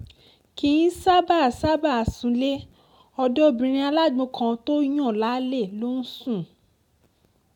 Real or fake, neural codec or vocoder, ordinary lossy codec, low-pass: real; none; MP3, 96 kbps; 19.8 kHz